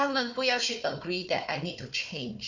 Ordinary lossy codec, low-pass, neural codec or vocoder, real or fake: none; 7.2 kHz; codec, 16 kHz, 4 kbps, FunCodec, trained on Chinese and English, 50 frames a second; fake